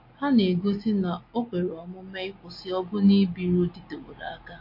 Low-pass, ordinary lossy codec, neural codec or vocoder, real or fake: 5.4 kHz; MP3, 32 kbps; none; real